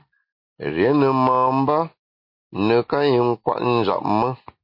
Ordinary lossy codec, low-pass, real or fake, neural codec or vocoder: MP3, 32 kbps; 5.4 kHz; real; none